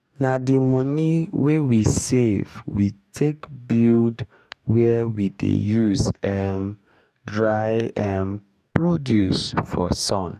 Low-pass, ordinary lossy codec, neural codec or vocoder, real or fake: 14.4 kHz; none; codec, 44.1 kHz, 2.6 kbps, DAC; fake